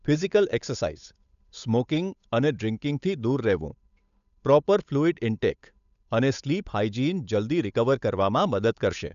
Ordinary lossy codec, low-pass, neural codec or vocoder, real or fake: none; 7.2 kHz; codec, 16 kHz, 8 kbps, FunCodec, trained on Chinese and English, 25 frames a second; fake